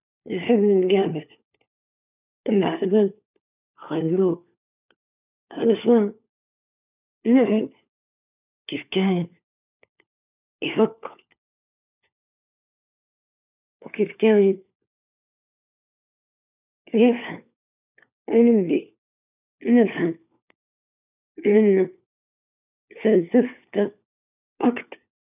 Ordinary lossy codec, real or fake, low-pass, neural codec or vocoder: none; fake; 3.6 kHz; codec, 16 kHz, 4 kbps, FunCodec, trained on LibriTTS, 50 frames a second